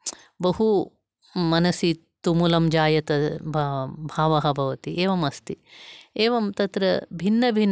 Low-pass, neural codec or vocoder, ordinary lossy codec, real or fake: none; none; none; real